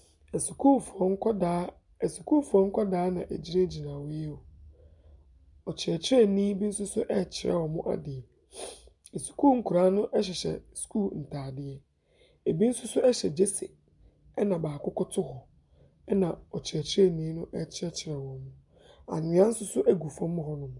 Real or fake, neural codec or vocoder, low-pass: real; none; 10.8 kHz